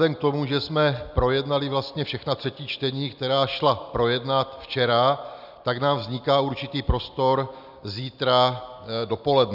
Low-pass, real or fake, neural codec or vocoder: 5.4 kHz; real; none